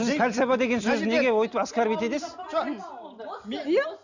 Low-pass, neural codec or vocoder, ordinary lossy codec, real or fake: 7.2 kHz; none; none; real